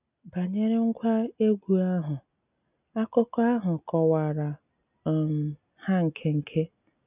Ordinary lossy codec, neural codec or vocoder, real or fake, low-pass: none; none; real; 3.6 kHz